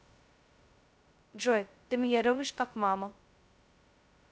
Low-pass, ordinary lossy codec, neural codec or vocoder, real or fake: none; none; codec, 16 kHz, 0.2 kbps, FocalCodec; fake